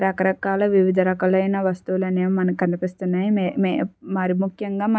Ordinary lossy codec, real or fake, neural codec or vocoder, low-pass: none; real; none; none